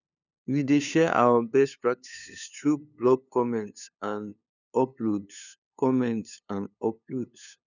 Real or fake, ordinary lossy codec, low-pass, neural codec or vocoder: fake; none; 7.2 kHz; codec, 16 kHz, 2 kbps, FunCodec, trained on LibriTTS, 25 frames a second